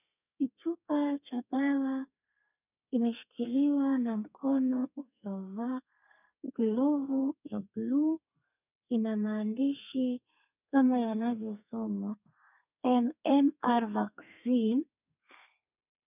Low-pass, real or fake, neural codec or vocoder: 3.6 kHz; fake; codec, 32 kHz, 1.9 kbps, SNAC